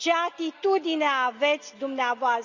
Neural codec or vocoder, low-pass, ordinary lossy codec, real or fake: none; 7.2 kHz; Opus, 64 kbps; real